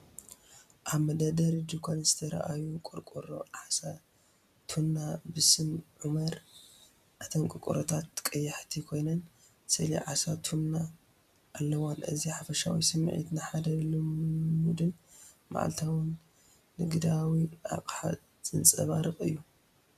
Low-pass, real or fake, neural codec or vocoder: 14.4 kHz; real; none